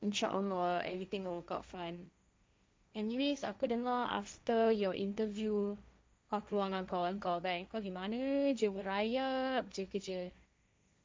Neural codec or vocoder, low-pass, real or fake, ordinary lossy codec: codec, 16 kHz, 1.1 kbps, Voila-Tokenizer; none; fake; none